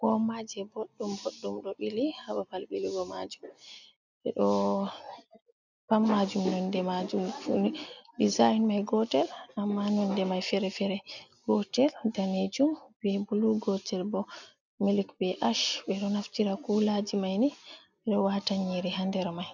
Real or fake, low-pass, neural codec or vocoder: real; 7.2 kHz; none